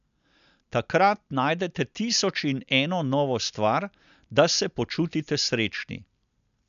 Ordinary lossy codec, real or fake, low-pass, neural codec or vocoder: none; real; 7.2 kHz; none